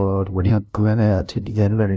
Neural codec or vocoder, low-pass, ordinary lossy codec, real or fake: codec, 16 kHz, 0.5 kbps, FunCodec, trained on LibriTTS, 25 frames a second; none; none; fake